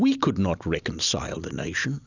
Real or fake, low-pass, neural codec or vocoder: real; 7.2 kHz; none